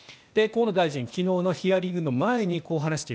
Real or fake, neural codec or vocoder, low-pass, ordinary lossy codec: fake; codec, 16 kHz, 0.8 kbps, ZipCodec; none; none